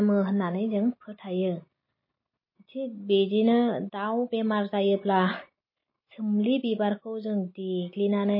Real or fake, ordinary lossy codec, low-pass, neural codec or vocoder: real; MP3, 24 kbps; 5.4 kHz; none